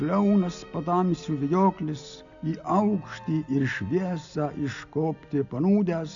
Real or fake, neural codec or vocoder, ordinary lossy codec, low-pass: real; none; AAC, 64 kbps; 7.2 kHz